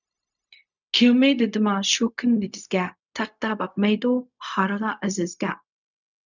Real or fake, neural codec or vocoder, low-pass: fake; codec, 16 kHz, 0.4 kbps, LongCat-Audio-Codec; 7.2 kHz